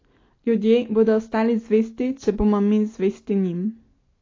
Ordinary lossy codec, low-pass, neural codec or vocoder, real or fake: AAC, 32 kbps; 7.2 kHz; none; real